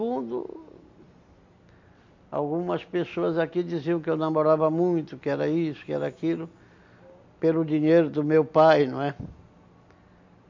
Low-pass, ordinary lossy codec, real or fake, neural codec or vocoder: 7.2 kHz; MP3, 48 kbps; real; none